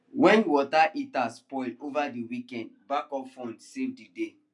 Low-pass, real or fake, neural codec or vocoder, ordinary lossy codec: 10.8 kHz; real; none; none